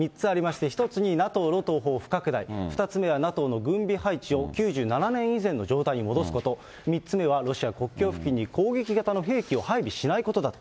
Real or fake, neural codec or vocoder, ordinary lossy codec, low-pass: real; none; none; none